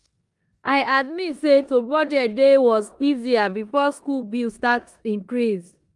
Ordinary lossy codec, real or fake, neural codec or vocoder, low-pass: Opus, 32 kbps; fake; codec, 16 kHz in and 24 kHz out, 0.9 kbps, LongCat-Audio-Codec, four codebook decoder; 10.8 kHz